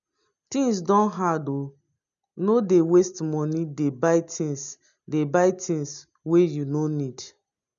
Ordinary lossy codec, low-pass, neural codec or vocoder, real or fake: none; 7.2 kHz; none; real